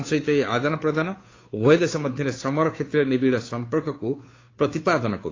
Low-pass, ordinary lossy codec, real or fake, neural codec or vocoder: 7.2 kHz; AAC, 32 kbps; fake; codec, 16 kHz, 2 kbps, FunCodec, trained on Chinese and English, 25 frames a second